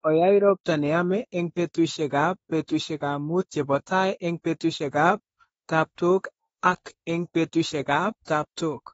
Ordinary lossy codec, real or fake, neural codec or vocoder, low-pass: AAC, 24 kbps; fake; autoencoder, 48 kHz, 32 numbers a frame, DAC-VAE, trained on Japanese speech; 19.8 kHz